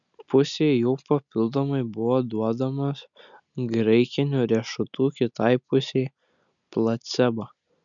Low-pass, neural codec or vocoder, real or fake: 7.2 kHz; none; real